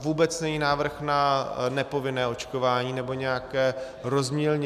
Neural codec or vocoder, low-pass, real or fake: none; 14.4 kHz; real